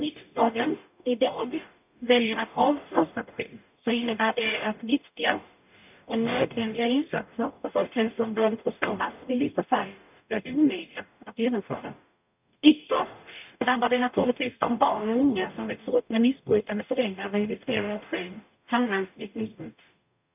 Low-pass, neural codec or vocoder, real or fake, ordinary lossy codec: 3.6 kHz; codec, 44.1 kHz, 0.9 kbps, DAC; fake; none